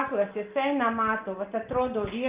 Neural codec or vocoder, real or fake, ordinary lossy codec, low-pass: none; real; Opus, 32 kbps; 3.6 kHz